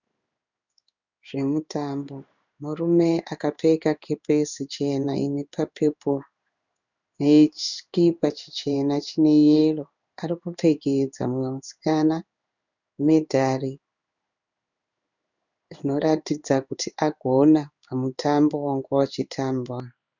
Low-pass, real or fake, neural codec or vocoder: 7.2 kHz; fake; codec, 16 kHz in and 24 kHz out, 1 kbps, XY-Tokenizer